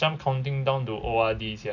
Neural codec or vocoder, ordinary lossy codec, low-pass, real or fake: none; none; 7.2 kHz; real